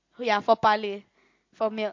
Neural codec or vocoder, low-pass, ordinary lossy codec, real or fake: none; 7.2 kHz; MP3, 48 kbps; real